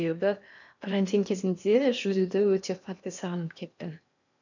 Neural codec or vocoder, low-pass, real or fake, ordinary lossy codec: codec, 16 kHz in and 24 kHz out, 0.8 kbps, FocalCodec, streaming, 65536 codes; 7.2 kHz; fake; none